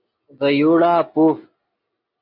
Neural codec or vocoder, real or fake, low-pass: none; real; 5.4 kHz